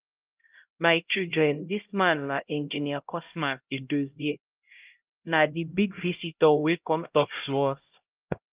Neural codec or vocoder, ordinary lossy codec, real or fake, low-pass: codec, 16 kHz, 0.5 kbps, X-Codec, HuBERT features, trained on LibriSpeech; Opus, 24 kbps; fake; 3.6 kHz